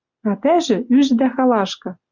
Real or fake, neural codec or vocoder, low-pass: real; none; 7.2 kHz